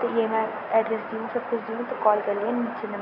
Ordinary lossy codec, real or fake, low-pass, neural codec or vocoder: none; real; 5.4 kHz; none